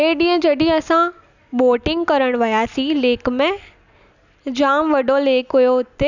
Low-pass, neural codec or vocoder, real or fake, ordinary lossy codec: 7.2 kHz; none; real; none